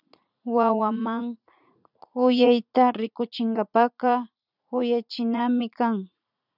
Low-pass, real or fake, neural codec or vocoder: 5.4 kHz; fake; vocoder, 44.1 kHz, 80 mel bands, Vocos